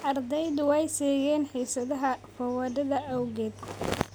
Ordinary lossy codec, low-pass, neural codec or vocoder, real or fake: none; none; none; real